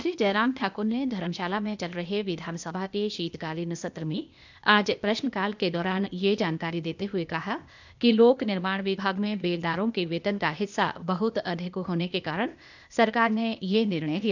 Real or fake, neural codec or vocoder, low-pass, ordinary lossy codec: fake; codec, 16 kHz, 0.8 kbps, ZipCodec; 7.2 kHz; none